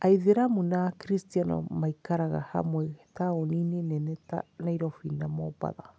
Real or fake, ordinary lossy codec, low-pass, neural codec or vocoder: real; none; none; none